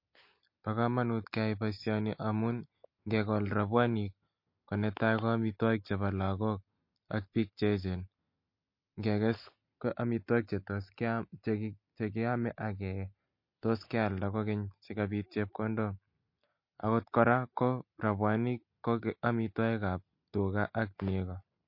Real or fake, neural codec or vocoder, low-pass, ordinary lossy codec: real; none; 5.4 kHz; MP3, 32 kbps